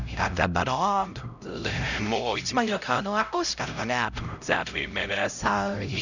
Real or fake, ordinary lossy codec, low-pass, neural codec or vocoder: fake; none; 7.2 kHz; codec, 16 kHz, 0.5 kbps, X-Codec, HuBERT features, trained on LibriSpeech